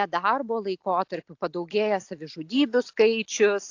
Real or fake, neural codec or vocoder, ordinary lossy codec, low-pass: real; none; AAC, 48 kbps; 7.2 kHz